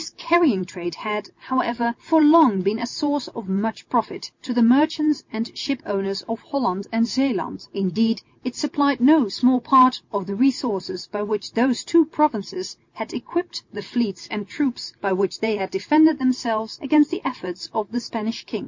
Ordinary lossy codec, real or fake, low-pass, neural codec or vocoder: MP3, 48 kbps; real; 7.2 kHz; none